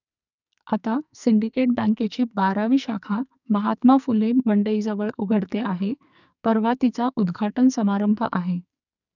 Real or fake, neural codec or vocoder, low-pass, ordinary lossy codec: fake; codec, 44.1 kHz, 2.6 kbps, SNAC; 7.2 kHz; none